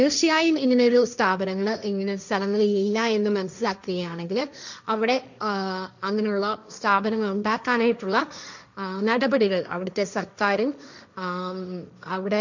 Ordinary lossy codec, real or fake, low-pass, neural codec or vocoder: none; fake; 7.2 kHz; codec, 16 kHz, 1.1 kbps, Voila-Tokenizer